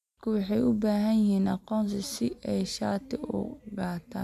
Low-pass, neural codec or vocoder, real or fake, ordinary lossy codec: 14.4 kHz; none; real; none